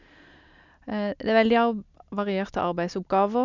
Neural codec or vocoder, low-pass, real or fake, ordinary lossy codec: none; 7.2 kHz; real; none